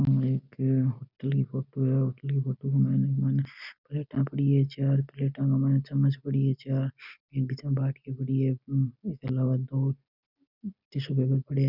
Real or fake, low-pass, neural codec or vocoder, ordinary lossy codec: real; 5.4 kHz; none; none